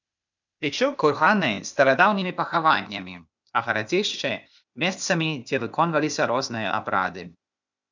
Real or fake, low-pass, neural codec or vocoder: fake; 7.2 kHz; codec, 16 kHz, 0.8 kbps, ZipCodec